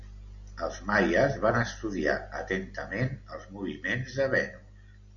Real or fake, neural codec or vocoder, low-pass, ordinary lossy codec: real; none; 7.2 kHz; MP3, 96 kbps